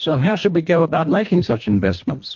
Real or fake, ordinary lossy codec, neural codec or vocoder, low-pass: fake; MP3, 48 kbps; codec, 24 kHz, 1.5 kbps, HILCodec; 7.2 kHz